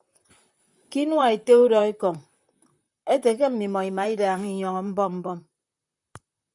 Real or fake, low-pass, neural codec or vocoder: fake; 10.8 kHz; vocoder, 44.1 kHz, 128 mel bands, Pupu-Vocoder